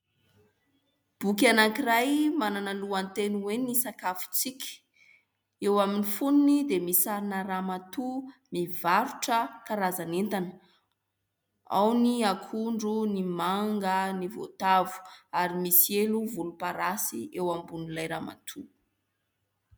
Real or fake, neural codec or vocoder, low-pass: real; none; 19.8 kHz